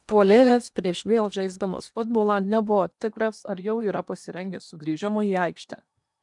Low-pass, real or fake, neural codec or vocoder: 10.8 kHz; fake; codec, 16 kHz in and 24 kHz out, 0.8 kbps, FocalCodec, streaming, 65536 codes